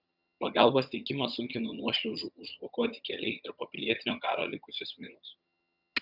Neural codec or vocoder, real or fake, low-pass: vocoder, 22.05 kHz, 80 mel bands, HiFi-GAN; fake; 5.4 kHz